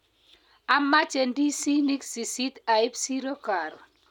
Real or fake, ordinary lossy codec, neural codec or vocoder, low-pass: real; none; none; 19.8 kHz